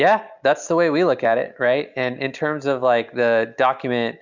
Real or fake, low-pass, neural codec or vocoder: real; 7.2 kHz; none